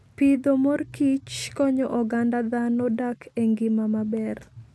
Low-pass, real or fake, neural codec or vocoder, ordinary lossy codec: none; real; none; none